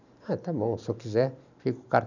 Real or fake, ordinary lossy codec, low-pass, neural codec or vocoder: fake; none; 7.2 kHz; vocoder, 44.1 kHz, 128 mel bands every 512 samples, BigVGAN v2